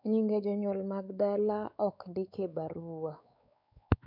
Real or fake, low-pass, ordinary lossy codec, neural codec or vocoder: fake; 5.4 kHz; none; codec, 16 kHz, 4 kbps, X-Codec, WavLM features, trained on Multilingual LibriSpeech